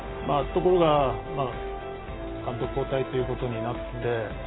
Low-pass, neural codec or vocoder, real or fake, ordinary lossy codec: 7.2 kHz; none; real; AAC, 16 kbps